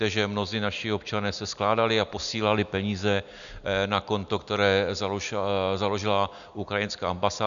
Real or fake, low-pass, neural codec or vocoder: real; 7.2 kHz; none